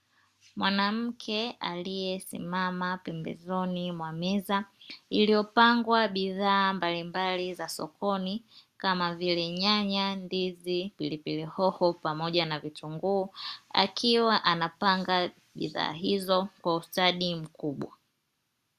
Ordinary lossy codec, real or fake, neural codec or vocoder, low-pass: Opus, 64 kbps; real; none; 14.4 kHz